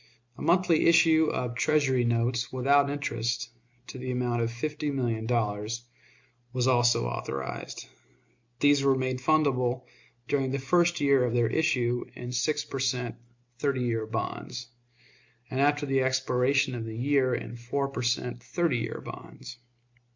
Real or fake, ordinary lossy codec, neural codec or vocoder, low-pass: real; MP3, 64 kbps; none; 7.2 kHz